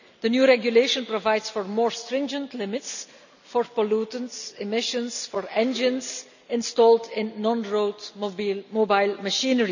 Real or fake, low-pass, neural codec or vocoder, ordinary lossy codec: real; 7.2 kHz; none; none